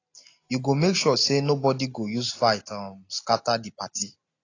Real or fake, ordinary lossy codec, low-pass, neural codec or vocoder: real; AAC, 32 kbps; 7.2 kHz; none